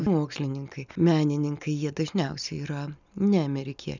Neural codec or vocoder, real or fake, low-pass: none; real; 7.2 kHz